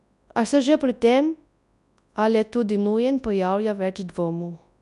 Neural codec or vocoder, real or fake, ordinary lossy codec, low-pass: codec, 24 kHz, 0.9 kbps, WavTokenizer, large speech release; fake; none; 10.8 kHz